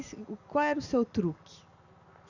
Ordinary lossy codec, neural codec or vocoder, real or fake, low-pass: none; none; real; 7.2 kHz